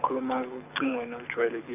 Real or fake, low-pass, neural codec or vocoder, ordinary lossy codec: fake; 3.6 kHz; codec, 24 kHz, 6 kbps, HILCodec; none